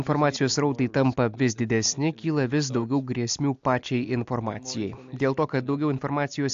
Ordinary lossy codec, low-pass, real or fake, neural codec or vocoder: AAC, 64 kbps; 7.2 kHz; real; none